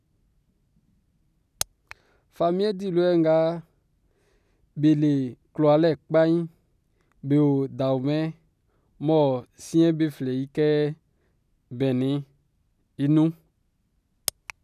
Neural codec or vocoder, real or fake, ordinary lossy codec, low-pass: none; real; none; 14.4 kHz